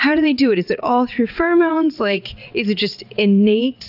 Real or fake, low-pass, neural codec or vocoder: fake; 5.4 kHz; vocoder, 22.05 kHz, 80 mel bands, WaveNeXt